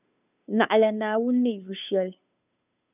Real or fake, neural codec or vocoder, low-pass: fake; codec, 16 kHz, 2 kbps, FunCodec, trained on Chinese and English, 25 frames a second; 3.6 kHz